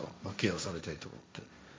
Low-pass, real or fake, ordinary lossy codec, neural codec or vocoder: none; fake; none; codec, 16 kHz, 1.1 kbps, Voila-Tokenizer